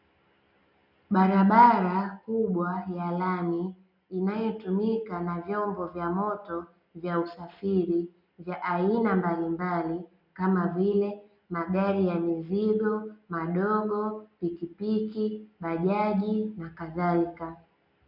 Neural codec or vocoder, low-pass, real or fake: none; 5.4 kHz; real